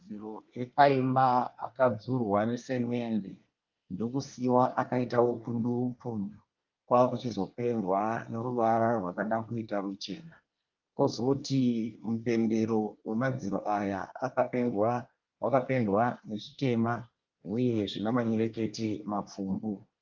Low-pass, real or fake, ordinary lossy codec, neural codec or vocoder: 7.2 kHz; fake; Opus, 24 kbps; codec, 24 kHz, 1 kbps, SNAC